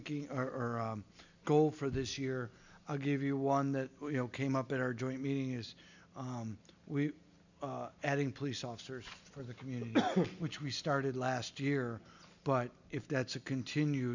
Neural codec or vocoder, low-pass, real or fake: none; 7.2 kHz; real